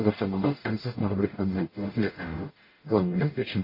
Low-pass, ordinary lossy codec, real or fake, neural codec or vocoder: 5.4 kHz; MP3, 24 kbps; fake; codec, 44.1 kHz, 0.9 kbps, DAC